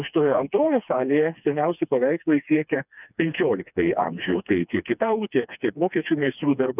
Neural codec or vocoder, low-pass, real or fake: codec, 16 kHz, 2 kbps, FreqCodec, smaller model; 3.6 kHz; fake